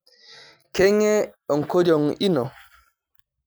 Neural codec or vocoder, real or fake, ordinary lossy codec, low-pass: none; real; none; none